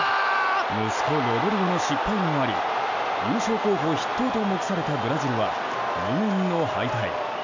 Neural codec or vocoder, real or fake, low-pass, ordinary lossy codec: none; real; 7.2 kHz; none